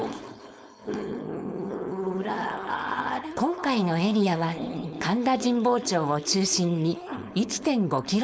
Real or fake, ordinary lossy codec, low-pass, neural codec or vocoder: fake; none; none; codec, 16 kHz, 4.8 kbps, FACodec